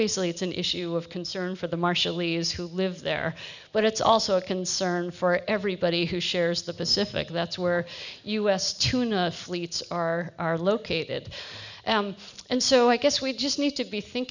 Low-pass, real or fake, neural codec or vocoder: 7.2 kHz; real; none